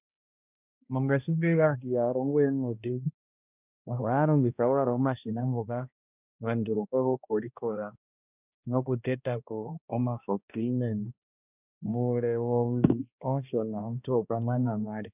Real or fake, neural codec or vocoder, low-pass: fake; codec, 16 kHz, 1 kbps, X-Codec, HuBERT features, trained on balanced general audio; 3.6 kHz